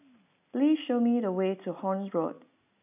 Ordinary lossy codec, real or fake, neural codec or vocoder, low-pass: none; real; none; 3.6 kHz